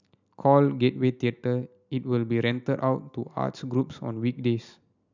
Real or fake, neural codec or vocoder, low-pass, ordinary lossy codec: real; none; 7.2 kHz; none